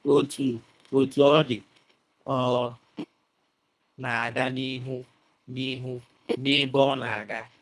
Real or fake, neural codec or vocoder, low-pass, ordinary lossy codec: fake; codec, 24 kHz, 1.5 kbps, HILCodec; none; none